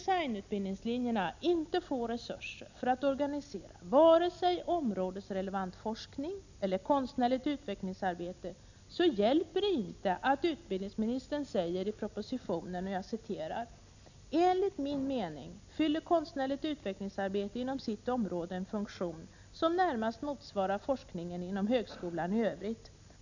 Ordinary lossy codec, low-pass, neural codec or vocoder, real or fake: none; 7.2 kHz; none; real